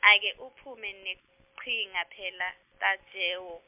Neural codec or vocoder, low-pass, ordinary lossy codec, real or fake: none; 3.6 kHz; MP3, 32 kbps; real